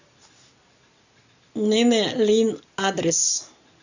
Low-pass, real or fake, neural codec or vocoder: 7.2 kHz; real; none